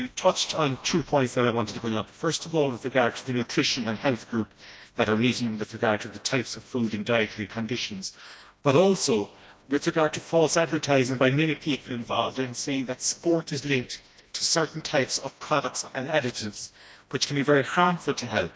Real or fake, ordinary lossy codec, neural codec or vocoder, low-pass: fake; none; codec, 16 kHz, 1 kbps, FreqCodec, smaller model; none